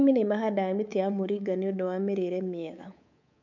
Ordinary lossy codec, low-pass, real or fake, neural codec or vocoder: none; 7.2 kHz; fake; codec, 24 kHz, 3.1 kbps, DualCodec